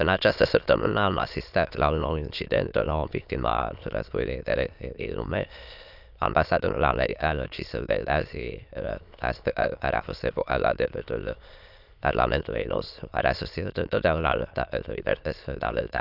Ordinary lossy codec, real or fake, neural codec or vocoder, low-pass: none; fake; autoencoder, 22.05 kHz, a latent of 192 numbers a frame, VITS, trained on many speakers; 5.4 kHz